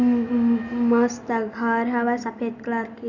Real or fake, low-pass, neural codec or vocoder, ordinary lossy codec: real; 7.2 kHz; none; none